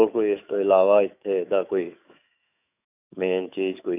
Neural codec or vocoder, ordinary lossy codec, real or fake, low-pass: autoencoder, 48 kHz, 128 numbers a frame, DAC-VAE, trained on Japanese speech; none; fake; 3.6 kHz